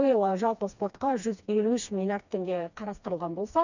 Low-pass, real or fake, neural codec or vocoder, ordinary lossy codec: 7.2 kHz; fake; codec, 16 kHz, 2 kbps, FreqCodec, smaller model; none